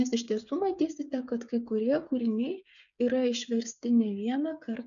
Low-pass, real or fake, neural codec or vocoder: 7.2 kHz; fake; codec, 16 kHz, 8 kbps, FreqCodec, smaller model